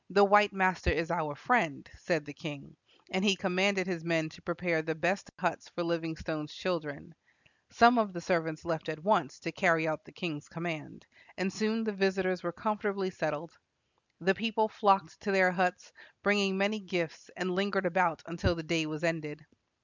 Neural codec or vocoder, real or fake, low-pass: none; real; 7.2 kHz